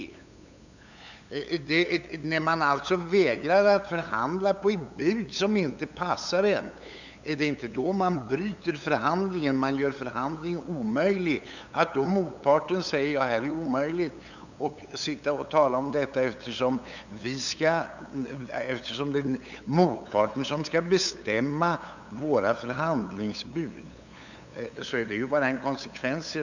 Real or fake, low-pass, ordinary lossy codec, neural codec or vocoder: fake; 7.2 kHz; none; codec, 16 kHz, 8 kbps, FunCodec, trained on LibriTTS, 25 frames a second